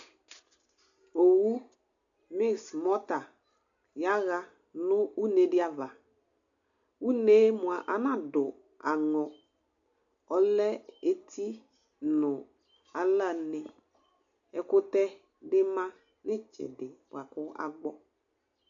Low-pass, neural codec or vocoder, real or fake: 7.2 kHz; none; real